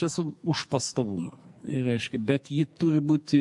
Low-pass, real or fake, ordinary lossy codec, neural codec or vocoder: 10.8 kHz; fake; MP3, 64 kbps; codec, 44.1 kHz, 2.6 kbps, SNAC